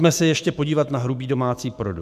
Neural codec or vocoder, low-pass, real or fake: autoencoder, 48 kHz, 128 numbers a frame, DAC-VAE, trained on Japanese speech; 14.4 kHz; fake